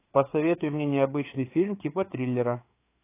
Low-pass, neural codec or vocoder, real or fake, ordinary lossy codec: 3.6 kHz; codec, 16 kHz, 4 kbps, FreqCodec, larger model; fake; AAC, 24 kbps